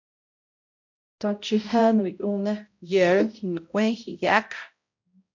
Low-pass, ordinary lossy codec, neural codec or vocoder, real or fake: 7.2 kHz; MP3, 64 kbps; codec, 16 kHz, 0.5 kbps, X-Codec, HuBERT features, trained on balanced general audio; fake